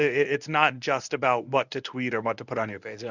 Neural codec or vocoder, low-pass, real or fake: codec, 24 kHz, 0.9 kbps, WavTokenizer, medium speech release version 1; 7.2 kHz; fake